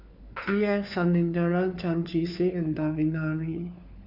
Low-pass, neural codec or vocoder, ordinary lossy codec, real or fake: 5.4 kHz; codec, 16 kHz, 4 kbps, FunCodec, trained on LibriTTS, 50 frames a second; none; fake